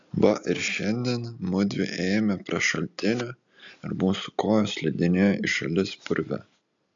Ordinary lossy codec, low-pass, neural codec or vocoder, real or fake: AAC, 64 kbps; 7.2 kHz; none; real